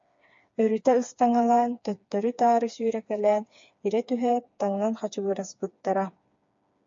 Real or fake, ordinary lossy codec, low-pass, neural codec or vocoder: fake; MP3, 48 kbps; 7.2 kHz; codec, 16 kHz, 4 kbps, FreqCodec, smaller model